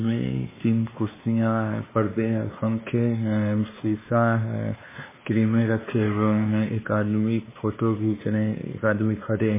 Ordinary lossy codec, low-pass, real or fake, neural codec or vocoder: MP3, 16 kbps; 3.6 kHz; fake; codec, 16 kHz, 2 kbps, X-Codec, WavLM features, trained on Multilingual LibriSpeech